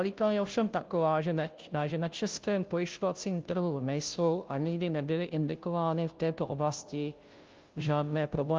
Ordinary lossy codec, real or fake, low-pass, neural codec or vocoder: Opus, 32 kbps; fake; 7.2 kHz; codec, 16 kHz, 0.5 kbps, FunCodec, trained on Chinese and English, 25 frames a second